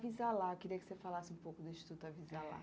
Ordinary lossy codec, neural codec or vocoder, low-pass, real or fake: none; none; none; real